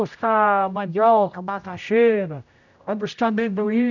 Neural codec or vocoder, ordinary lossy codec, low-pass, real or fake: codec, 16 kHz, 0.5 kbps, X-Codec, HuBERT features, trained on general audio; none; 7.2 kHz; fake